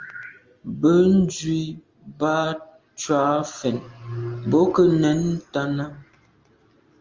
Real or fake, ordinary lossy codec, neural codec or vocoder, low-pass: real; Opus, 32 kbps; none; 7.2 kHz